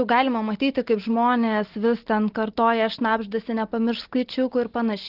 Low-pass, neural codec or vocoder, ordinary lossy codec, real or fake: 5.4 kHz; none; Opus, 16 kbps; real